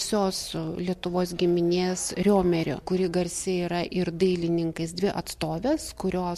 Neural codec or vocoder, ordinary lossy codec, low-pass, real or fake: none; MP3, 64 kbps; 14.4 kHz; real